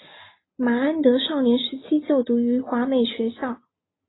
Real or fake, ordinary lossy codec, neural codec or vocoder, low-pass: real; AAC, 16 kbps; none; 7.2 kHz